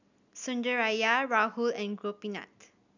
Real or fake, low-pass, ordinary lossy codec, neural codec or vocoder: real; 7.2 kHz; none; none